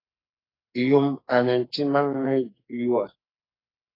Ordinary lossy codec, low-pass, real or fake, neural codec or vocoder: AAC, 24 kbps; 5.4 kHz; fake; codec, 44.1 kHz, 2.6 kbps, SNAC